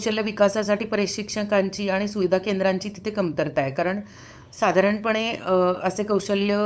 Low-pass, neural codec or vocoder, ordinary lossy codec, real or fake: none; codec, 16 kHz, 8 kbps, FunCodec, trained on LibriTTS, 25 frames a second; none; fake